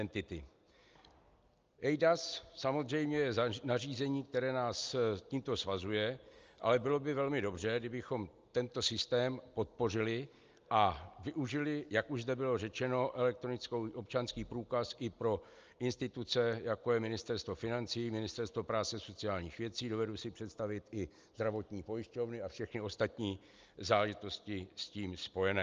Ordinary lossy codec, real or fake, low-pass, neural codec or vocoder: Opus, 24 kbps; real; 7.2 kHz; none